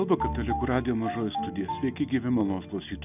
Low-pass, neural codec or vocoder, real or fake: 3.6 kHz; none; real